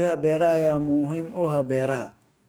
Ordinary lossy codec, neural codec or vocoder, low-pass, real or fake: none; codec, 44.1 kHz, 2.6 kbps, DAC; none; fake